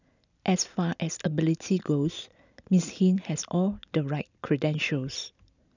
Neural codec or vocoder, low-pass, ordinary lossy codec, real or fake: none; 7.2 kHz; none; real